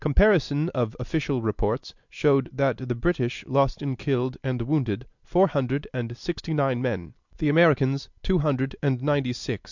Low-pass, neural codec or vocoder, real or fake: 7.2 kHz; none; real